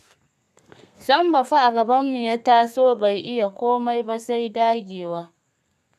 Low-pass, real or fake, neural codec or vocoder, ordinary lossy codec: 14.4 kHz; fake; codec, 32 kHz, 1.9 kbps, SNAC; none